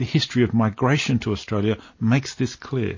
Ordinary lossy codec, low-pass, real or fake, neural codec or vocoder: MP3, 32 kbps; 7.2 kHz; real; none